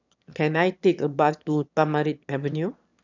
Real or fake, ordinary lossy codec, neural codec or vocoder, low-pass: fake; none; autoencoder, 22.05 kHz, a latent of 192 numbers a frame, VITS, trained on one speaker; 7.2 kHz